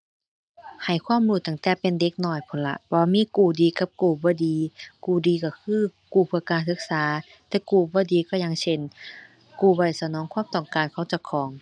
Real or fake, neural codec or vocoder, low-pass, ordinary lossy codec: real; none; 9.9 kHz; none